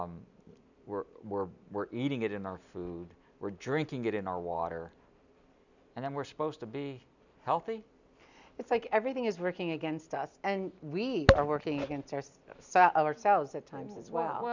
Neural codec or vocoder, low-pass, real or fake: none; 7.2 kHz; real